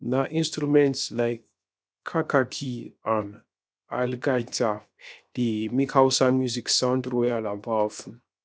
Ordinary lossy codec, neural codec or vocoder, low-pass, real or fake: none; codec, 16 kHz, 0.7 kbps, FocalCodec; none; fake